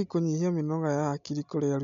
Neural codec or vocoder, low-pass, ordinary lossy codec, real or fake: none; 7.2 kHz; MP3, 64 kbps; real